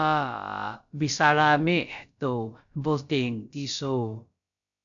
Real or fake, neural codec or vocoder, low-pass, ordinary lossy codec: fake; codec, 16 kHz, about 1 kbps, DyCAST, with the encoder's durations; 7.2 kHz; MP3, 96 kbps